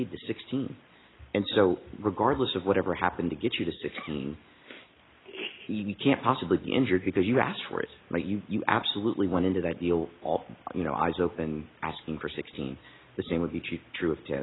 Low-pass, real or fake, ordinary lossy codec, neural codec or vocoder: 7.2 kHz; real; AAC, 16 kbps; none